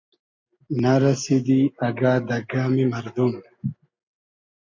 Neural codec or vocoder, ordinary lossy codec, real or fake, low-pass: none; MP3, 48 kbps; real; 7.2 kHz